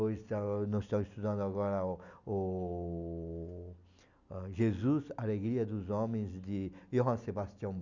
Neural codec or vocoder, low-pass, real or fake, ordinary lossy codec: none; 7.2 kHz; real; none